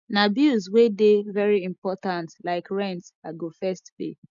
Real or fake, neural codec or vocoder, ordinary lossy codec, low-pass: real; none; none; 7.2 kHz